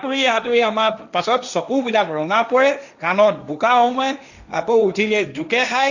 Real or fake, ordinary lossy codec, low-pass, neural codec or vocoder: fake; none; 7.2 kHz; codec, 16 kHz, 1.1 kbps, Voila-Tokenizer